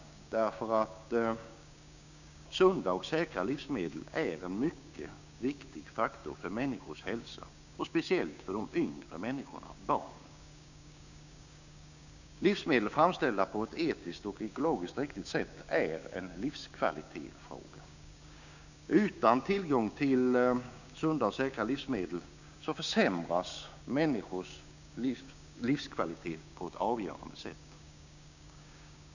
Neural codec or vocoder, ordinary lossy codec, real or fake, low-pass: codec, 16 kHz, 6 kbps, DAC; none; fake; 7.2 kHz